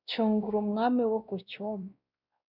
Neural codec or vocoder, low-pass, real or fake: codec, 16 kHz, 1 kbps, X-Codec, WavLM features, trained on Multilingual LibriSpeech; 5.4 kHz; fake